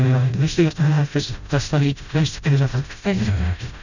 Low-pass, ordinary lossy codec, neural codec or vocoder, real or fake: 7.2 kHz; none; codec, 16 kHz, 0.5 kbps, FreqCodec, smaller model; fake